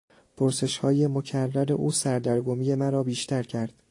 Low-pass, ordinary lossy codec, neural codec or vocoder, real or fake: 10.8 kHz; AAC, 48 kbps; none; real